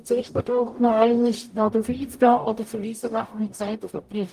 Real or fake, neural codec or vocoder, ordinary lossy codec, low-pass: fake; codec, 44.1 kHz, 0.9 kbps, DAC; Opus, 32 kbps; 14.4 kHz